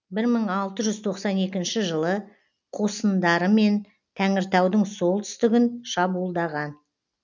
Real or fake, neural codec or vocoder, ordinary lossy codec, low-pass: real; none; none; 7.2 kHz